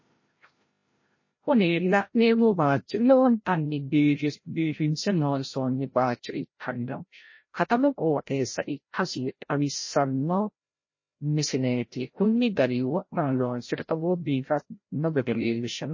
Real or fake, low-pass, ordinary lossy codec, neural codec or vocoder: fake; 7.2 kHz; MP3, 32 kbps; codec, 16 kHz, 0.5 kbps, FreqCodec, larger model